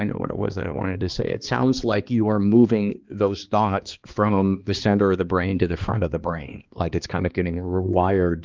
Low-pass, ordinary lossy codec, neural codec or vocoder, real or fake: 7.2 kHz; Opus, 32 kbps; codec, 16 kHz, 2 kbps, X-Codec, HuBERT features, trained on balanced general audio; fake